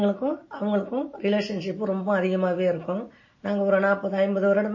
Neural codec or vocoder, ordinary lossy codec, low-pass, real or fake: none; MP3, 32 kbps; 7.2 kHz; real